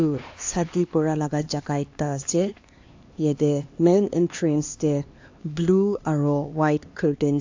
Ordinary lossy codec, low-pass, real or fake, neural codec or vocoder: AAC, 48 kbps; 7.2 kHz; fake; codec, 16 kHz, 2 kbps, X-Codec, HuBERT features, trained on LibriSpeech